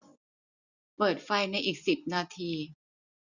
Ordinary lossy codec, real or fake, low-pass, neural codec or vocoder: none; real; 7.2 kHz; none